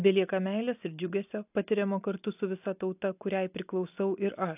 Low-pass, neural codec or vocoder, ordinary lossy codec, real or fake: 3.6 kHz; none; AAC, 32 kbps; real